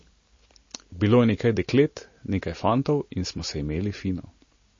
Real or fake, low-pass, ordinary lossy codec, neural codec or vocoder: real; 7.2 kHz; MP3, 32 kbps; none